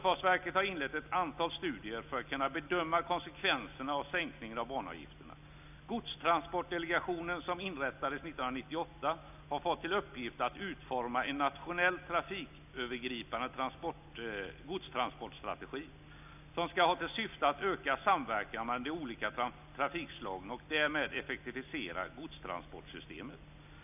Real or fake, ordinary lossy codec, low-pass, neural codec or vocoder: real; none; 3.6 kHz; none